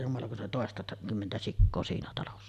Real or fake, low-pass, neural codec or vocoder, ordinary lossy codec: real; 14.4 kHz; none; none